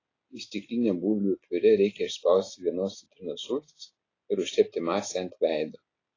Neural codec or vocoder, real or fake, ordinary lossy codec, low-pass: none; real; AAC, 32 kbps; 7.2 kHz